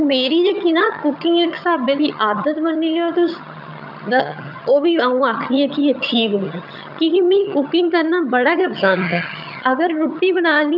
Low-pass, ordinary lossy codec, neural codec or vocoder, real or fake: 5.4 kHz; none; vocoder, 22.05 kHz, 80 mel bands, HiFi-GAN; fake